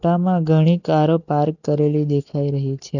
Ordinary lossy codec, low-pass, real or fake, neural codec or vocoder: none; 7.2 kHz; real; none